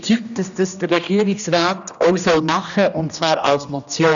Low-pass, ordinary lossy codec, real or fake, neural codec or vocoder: 7.2 kHz; none; fake; codec, 16 kHz, 1 kbps, X-Codec, HuBERT features, trained on general audio